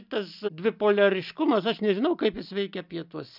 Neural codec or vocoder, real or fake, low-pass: none; real; 5.4 kHz